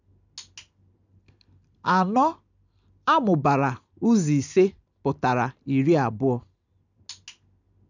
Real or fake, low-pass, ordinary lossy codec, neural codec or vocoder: real; 7.2 kHz; none; none